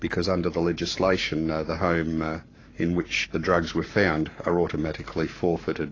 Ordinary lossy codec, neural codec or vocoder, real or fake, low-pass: AAC, 32 kbps; codec, 44.1 kHz, 7.8 kbps, Pupu-Codec; fake; 7.2 kHz